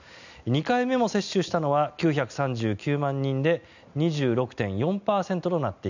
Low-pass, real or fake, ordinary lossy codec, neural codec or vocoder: 7.2 kHz; real; none; none